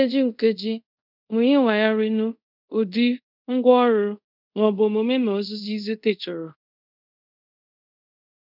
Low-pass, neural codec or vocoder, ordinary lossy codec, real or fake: 5.4 kHz; codec, 24 kHz, 0.5 kbps, DualCodec; none; fake